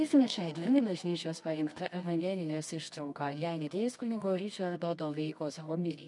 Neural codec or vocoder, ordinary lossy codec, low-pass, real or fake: codec, 24 kHz, 0.9 kbps, WavTokenizer, medium music audio release; MP3, 96 kbps; 10.8 kHz; fake